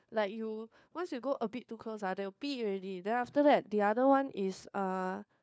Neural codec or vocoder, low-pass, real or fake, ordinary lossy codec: codec, 16 kHz, 4 kbps, FunCodec, trained on LibriTTS, 50 frames a second; none; fake; none